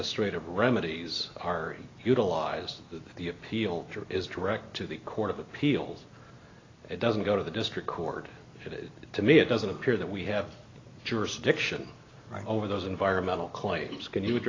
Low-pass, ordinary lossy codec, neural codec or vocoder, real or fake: 7.2 kHz; AAC, 32 kbps; none; real